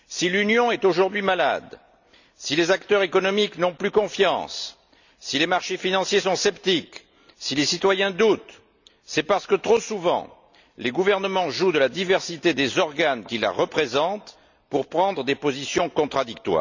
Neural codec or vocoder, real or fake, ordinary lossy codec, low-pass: none; real; none; 7.2 kHz